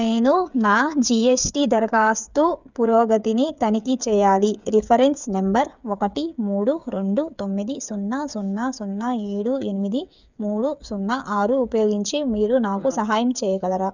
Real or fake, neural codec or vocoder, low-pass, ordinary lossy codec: fake; codec, 16 kHz, 8 kbps, FreqCodec, smaller model; 7.2 kHz; none